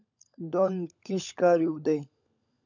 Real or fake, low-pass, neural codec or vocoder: fake; 7.2 kHz; codec, 16 kHz, 16 kbps, FunCodec, trained on LibriTTS, 50 frames a second